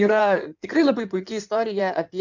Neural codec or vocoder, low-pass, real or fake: codec, 16 kHz in and 24 kHz out, 2.2 kbps, FireRedTTS-2 codec; 7.2 kHz; fake